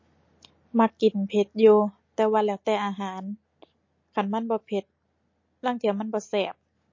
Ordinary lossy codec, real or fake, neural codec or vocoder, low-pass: MP3, 32 kbps; real; none; 7.2 kHz